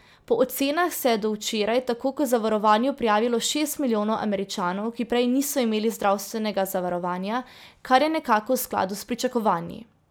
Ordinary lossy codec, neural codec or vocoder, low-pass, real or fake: none; none; none; real